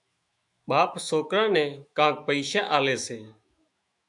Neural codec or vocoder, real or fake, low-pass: autoencoder, 48 kHz, 128 numbers a frame, DAC-VAE, trained on Japanese speech; fake; 10.8 kHz